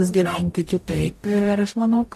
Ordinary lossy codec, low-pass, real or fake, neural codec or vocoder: AAC, 64 kbps; 14.4 kHz; fake; codec, 44.1 kHz, 0.9 kbps, DAC